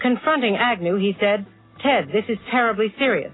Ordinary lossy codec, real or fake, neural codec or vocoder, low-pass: AAC, 16 kbps; real; none; 7.2 kHz